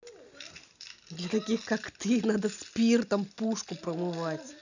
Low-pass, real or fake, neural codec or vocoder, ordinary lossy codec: 7.2 kHz; real; none; none